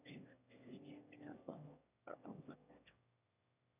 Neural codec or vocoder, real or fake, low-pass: autoencoder, 22.05 kHz, a latent of 192 numbers a frame, VITS, trained on one speaker; fake; 3.6 kHz